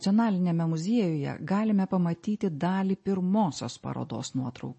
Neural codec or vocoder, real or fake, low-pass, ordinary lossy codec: none; real; 10.8 kHz; MP3, 32 kbps